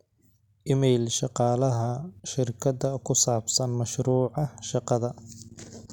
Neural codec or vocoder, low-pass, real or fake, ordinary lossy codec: none; 19.8 kHz; real; none